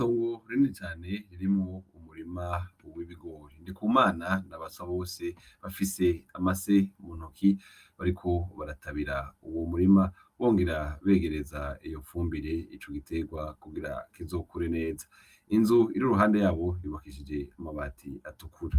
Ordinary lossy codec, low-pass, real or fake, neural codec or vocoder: Opus, 32 kbps; 14.4 kHz; real; none